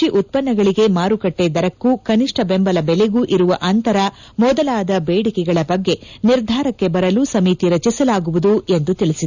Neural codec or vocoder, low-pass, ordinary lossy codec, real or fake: none; 7.2 kHz; none; real